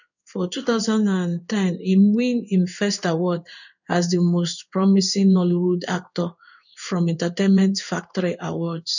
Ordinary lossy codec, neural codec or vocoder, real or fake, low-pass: none; codec, 16 kHz in and 24 kHz out, 1 kbps, XY-Tokenizer; fake; 7.2 kHz